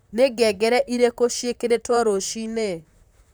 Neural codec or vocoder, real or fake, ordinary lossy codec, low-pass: vocoder, 44.1 kHz, 128 mel bands, Pupu-Vocoder; fake; none; none